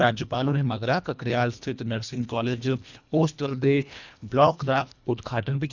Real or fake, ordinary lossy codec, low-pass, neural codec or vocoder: fake; none; 7.2 kHz; codec, 24 kHz, 1.5 kbps, HILCodec